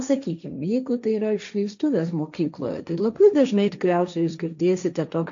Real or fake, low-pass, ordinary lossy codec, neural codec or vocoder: fake; 7.2 kHz; MP3, 64 kbps; codec, 16 kHz, 1.1 kbps, Voila-Tokenizer